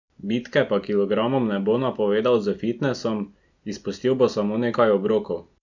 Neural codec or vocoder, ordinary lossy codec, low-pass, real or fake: none; none; 7.2 kHz; real